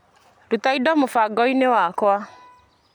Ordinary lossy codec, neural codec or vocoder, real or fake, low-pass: none; none; real; 19.8 kHz